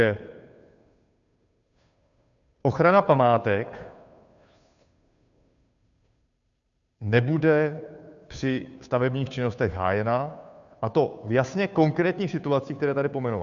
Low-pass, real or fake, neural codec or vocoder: 7.2 kHz; fake; codec, 16 kHz, 2 kbps, FunCodec, trained on Chinese and English, 25 frames a second